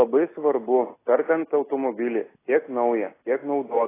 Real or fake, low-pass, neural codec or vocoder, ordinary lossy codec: real; 3.6 kHz; none; AAC, 16 kbps